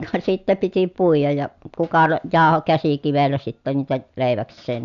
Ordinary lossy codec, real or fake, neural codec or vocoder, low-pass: none; real; none; 7.2 kHz